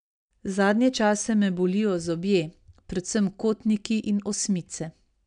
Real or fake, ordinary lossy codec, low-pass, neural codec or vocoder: real; none; 9.9 kHz; none